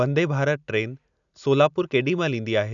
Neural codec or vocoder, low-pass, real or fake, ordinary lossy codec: none; 7.2 kHz; real; none